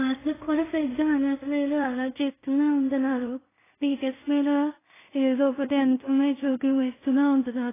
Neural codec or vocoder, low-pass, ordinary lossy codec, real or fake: codec, 16 kHz in and 24 kHz out, 0.4 kbps, LongCat-Audio-Codec, two codebook decoder; 3.6 kHz; AAC, 16 kbps; fake